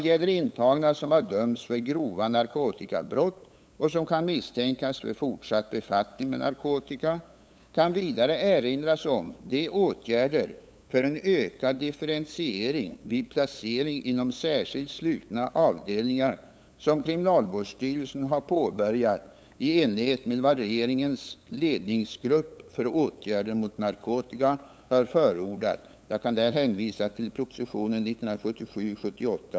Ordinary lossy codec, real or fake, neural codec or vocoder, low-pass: none; fake; codec, 16 kHz, 8 kbps, FunCodec, trained on LibriTTS, 25 frames a second; none